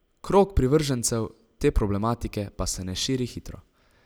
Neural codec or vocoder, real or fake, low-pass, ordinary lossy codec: none; real; none; none